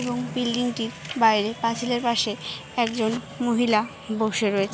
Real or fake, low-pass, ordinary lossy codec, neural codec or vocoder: real; none; none; none